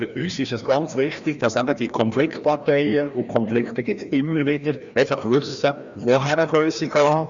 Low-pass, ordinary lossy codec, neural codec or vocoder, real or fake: 7.2 kHz; Opus, 64 kbps; codec, 16 kHz, 1 kbps, FreqCodec, larger model; fake